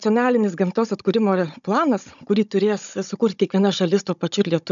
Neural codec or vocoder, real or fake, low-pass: codec, 16 kHz, 16 kbps, FunCodec, trained on Chinese and English, 50 frames a second; fake; 7.2 kHz